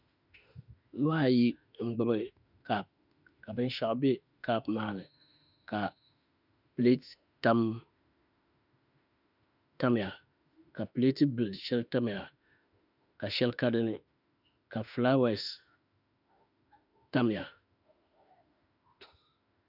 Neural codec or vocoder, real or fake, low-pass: autoencoder, 48 kHz, 32 numbers a frame, DAC-VAE, trained on Japanese speech; fake; 5.4 kHz